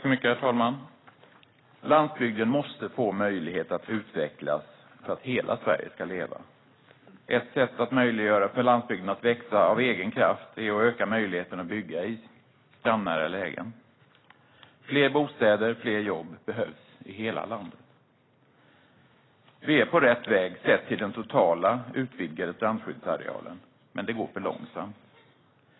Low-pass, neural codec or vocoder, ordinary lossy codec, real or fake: 7.2 kHz; none; AAC, 16 kbps; real